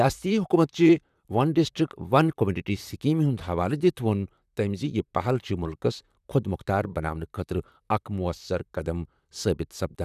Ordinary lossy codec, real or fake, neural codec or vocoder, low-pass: none; fake; vocoder, 44.1 kHz, 128 mel bands, Pupu-Vocoder; 14.4 kHz